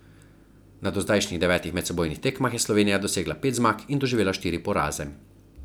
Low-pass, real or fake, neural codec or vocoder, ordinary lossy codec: none; real; none; none